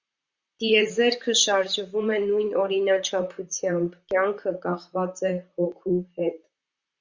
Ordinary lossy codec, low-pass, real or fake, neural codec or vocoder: Opus, 64 kbps; 7.2 kHz; fake; vocoder, 44.1 kHz, 128 mel bands, Pupu-Vocoder